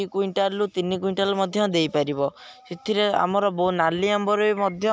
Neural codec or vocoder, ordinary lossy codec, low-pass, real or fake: none; none; none; real